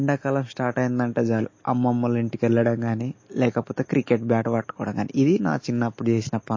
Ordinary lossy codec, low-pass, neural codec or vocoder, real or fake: MP3, 32 kbps; 7.2 kHz; none; real